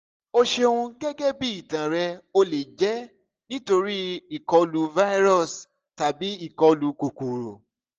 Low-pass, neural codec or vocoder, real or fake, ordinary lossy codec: 7.2 kHz; none; real; Opus, 24 kbps